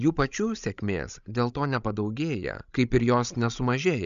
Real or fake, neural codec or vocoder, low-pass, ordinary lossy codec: fake; codec, 16 kHz, 16 kbps, FreqCodec, larger model; 7.2 kHz; MP3, 96 kbps